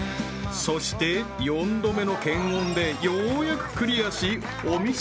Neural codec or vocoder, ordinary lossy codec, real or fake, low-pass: none; none; real; none